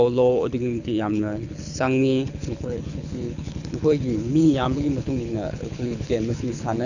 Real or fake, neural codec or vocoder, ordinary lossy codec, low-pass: fake; codec, 24 kHz, 6 kbps, HILCodec; none; 7.2 kHz